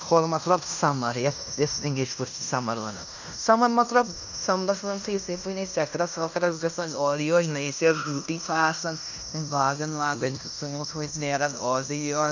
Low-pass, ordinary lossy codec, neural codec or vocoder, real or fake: 7.2 kHz; none; codec, 16 kHz in and 24 kHz out, 0.9 kbps, LongCat-Audio-Codec, fine tuned four codebook decoder; fake